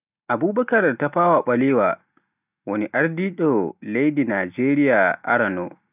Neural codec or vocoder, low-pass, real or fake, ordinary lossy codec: none; 3.6 kHz; real; none